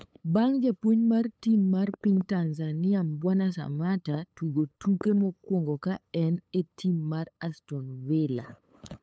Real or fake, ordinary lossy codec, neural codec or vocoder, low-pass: fake; none; codec, 16 kHz, 8 kbps, FunCodec, trained on LibriTTS, 25 frames a second; none